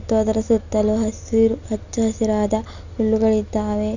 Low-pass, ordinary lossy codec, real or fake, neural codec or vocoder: 7.2 kHz; none; real; none